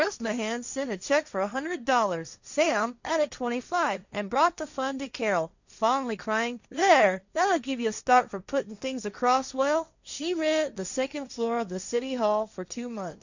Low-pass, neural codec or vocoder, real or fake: 7.2 kHz; codec, 16 kHz, 1.1 kbps, Voila-Tokenizer; fake